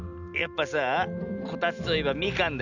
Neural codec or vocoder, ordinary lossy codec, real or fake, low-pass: none; none; real; 7.2 kHz